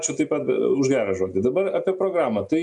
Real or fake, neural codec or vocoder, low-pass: real; none; 10.8 kHz